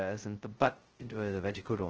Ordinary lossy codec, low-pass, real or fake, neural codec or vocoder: Opus, 16 kbps; 7.2 kHz; fake; codec, 16 kHz, 0.2 kbps, FocalCodec